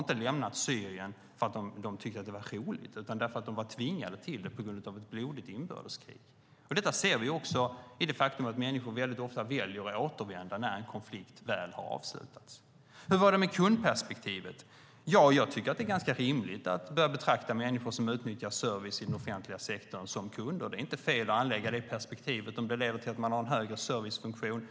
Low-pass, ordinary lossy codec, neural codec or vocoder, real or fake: none; none; none; real